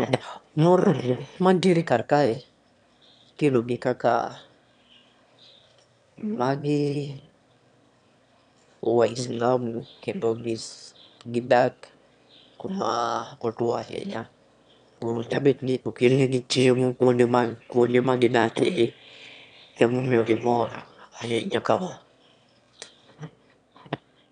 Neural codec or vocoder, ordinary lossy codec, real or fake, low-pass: autoencoder, 22.05 kHz, a latent of 192 numbers a frame, VITS, trained on one speaker; none; fake; 9.9 kHz